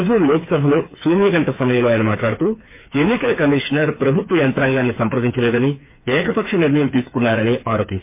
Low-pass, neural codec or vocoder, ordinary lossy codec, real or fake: 3.6 kHz; codec, 16 kHz, 4 kbps, FreqCodec, smaller model; MP3, 24 kbps; fake